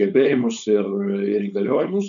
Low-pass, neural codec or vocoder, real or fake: 7.2 kHz; codec, 16 kHz, 4.8 kbps, FACodec; fake